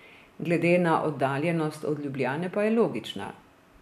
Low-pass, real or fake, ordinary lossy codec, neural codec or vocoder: 14.4 kHz; real; none; none